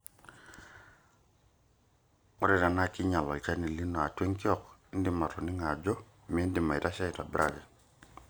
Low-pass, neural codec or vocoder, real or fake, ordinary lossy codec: none; none; real; none